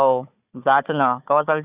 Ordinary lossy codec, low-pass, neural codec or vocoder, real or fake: Opus, 64 kbps; 3.6 kHz; codec, 16 kHz, 4 kbps, FunCodec, trained on Chinese and English, 50 frames a second; fake